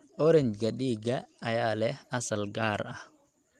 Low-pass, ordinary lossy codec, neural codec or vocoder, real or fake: 14.4 kHz; Opus, 24 kbps; none; real